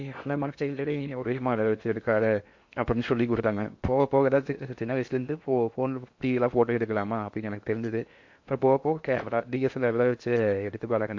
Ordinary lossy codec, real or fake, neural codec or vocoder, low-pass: MP3, 48 kbps; fake; codec, 16 kHz in and 24 kHz out, 0.8 kbps, FocalCodec, streaming, 65536 codes; 7.2 kHz